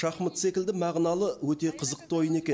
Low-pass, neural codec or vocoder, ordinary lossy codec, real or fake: none; none; none; real